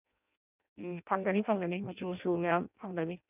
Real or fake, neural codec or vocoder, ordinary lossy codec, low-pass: fake; codec, 16 kHz in and 24 kHz out, 0.6 kbps, FireRedTTS-2 codec; none; 3.6 kHz